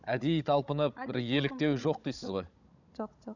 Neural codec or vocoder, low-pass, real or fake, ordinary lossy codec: codec, 16 kHz, 16 kbps, FunCodec, trained on Chinese and English, 50 frames a second; 7.2 kHz; fake; none